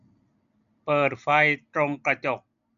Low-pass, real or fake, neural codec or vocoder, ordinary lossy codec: 7.2 kHz; real; none; none